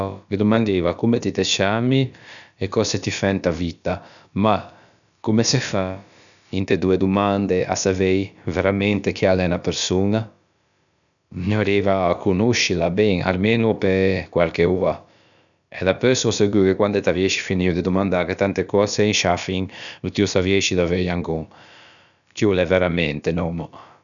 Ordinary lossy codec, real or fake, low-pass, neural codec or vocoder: none; fake; 7.2 kHz; codec, 16 kHz, about 1 kbps, DyCAST, with the encoder's durations